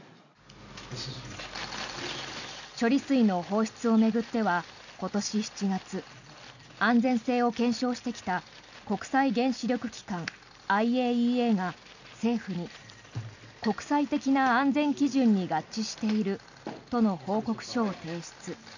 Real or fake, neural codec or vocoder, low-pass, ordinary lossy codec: real; none; 7.2 kHz; none